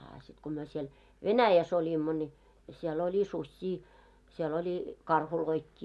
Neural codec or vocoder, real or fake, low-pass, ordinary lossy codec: none; real; none; none